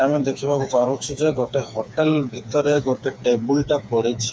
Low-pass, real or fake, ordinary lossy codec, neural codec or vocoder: none; fake; none; codec, 16 kHz, 4 kbps, FreqCodec, smaller model